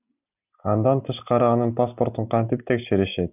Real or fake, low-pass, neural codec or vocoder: real; 3.6 kHz; none